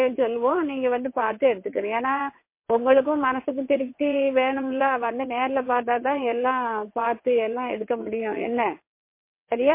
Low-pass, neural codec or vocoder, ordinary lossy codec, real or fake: 3.6 kHz; vocoder, 22.05 kHz, 80 mel bands, WaveNeXt; MP3, 24 kbps; fake